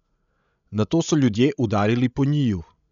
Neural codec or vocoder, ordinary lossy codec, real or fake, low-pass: codec, 16 kHz, 16 kbps, FreqCodec, larger model; none; fake; 7.2 kHz